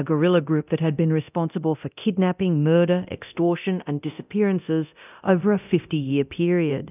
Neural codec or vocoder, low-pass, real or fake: codec, 24 kHz, 0.9 kbps, DualCodec; 3.6 kHz; fake